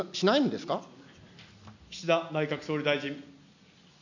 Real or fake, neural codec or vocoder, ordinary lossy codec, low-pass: real; none; none; 7.2 kHz